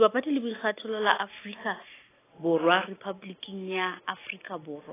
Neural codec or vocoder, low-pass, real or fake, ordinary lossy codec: none; 3.6 kHz; real; AAC, 16 kbps